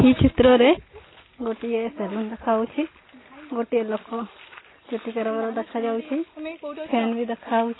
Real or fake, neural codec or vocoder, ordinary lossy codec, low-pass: real; none; AAC, 16 kbps; 7.2 kHz